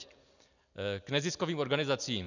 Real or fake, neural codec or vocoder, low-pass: real; none; 7.2 kHz